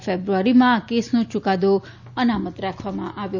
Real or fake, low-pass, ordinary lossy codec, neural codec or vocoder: real; 7.2 kHz; none; none